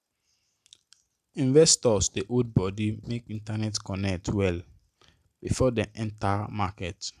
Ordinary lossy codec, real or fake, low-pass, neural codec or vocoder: MP3, 96 kbps; real; 14.4 kHz; none